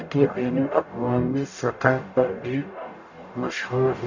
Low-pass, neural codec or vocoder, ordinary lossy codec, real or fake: 7.2 kHz; codec, 44.1 kHz, 0.9 kbps, DAC; none; fake